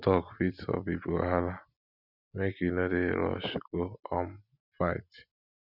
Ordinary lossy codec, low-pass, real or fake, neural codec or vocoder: none; 5.4 kHz; real; none